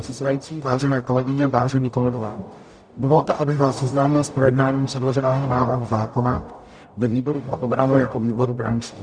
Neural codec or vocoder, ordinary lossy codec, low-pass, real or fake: codec, 44.1 kHz, 0.9 kbps, DAC; Opus, 64 kbps; 9.9 kHz; fake